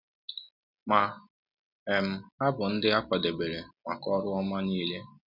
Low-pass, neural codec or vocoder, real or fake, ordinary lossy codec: 5.4 kHz; none; real; none